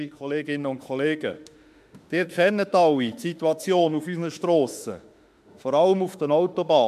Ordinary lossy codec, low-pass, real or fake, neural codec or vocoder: MP3, 96 kbps; 14.4 kHz; fake; autoencoder, 48 kHz, 32 numbers a frame, DAC-VAE, trained on Japanese speech